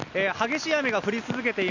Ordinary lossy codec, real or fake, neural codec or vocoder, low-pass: none; real; none; 7.2 kHz